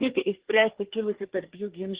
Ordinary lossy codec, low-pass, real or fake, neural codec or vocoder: Opus, 16 kbps; 3.6 kHz; fake; codec, 24 kHz, 1 kbps, SNAC